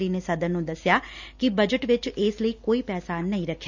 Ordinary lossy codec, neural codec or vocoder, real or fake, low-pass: none; none; real; 7.2 kHz